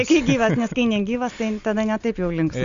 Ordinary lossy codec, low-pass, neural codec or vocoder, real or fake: Opus, 64 kbps; 7.2 kHz; none; real